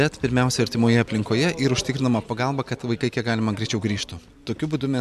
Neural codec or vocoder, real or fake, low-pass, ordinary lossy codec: none; real; 14.4 kHz; MP3, 96 kbps